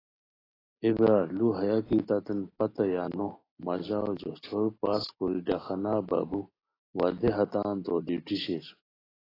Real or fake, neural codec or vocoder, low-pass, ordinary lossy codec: real; none; 5.4 kHz; AAC, 24 kbps